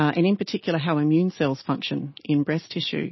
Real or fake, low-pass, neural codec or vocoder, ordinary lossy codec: real; 7.2 kHz; none; MP3, 24 kbps